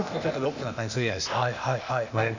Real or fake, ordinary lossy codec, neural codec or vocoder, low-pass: fake; none; codec, 16 kHz, 0.8 kbps, ZipCodec; 7.2 kHz